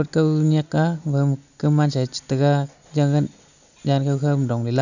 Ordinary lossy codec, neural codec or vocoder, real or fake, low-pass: none; none; real; 7.2 kHz